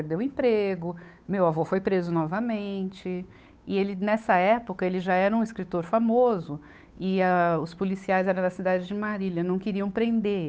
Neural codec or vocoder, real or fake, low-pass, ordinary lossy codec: codec, 16 kHz, 8 kbps, FunCodec, trained on Chinese and English, 25 frames a second; fake; none; none